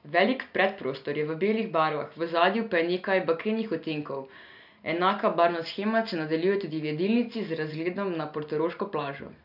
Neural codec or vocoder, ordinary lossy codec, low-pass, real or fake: none; none; 5.4 kHz; real